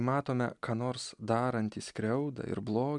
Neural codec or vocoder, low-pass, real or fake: none; 10.8 kHz; real